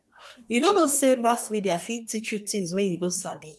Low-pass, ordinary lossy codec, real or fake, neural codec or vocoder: none; none; fake; codec, 24 kHz, 1 kbps, SNAC